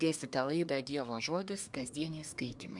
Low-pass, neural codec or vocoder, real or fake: 10.8 kHz; codec, 24 kHz, 1 kbps, SNAC; fake